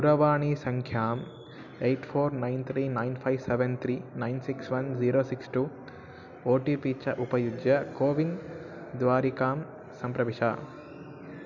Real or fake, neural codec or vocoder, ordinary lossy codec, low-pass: real; none; none; 7.2 kHz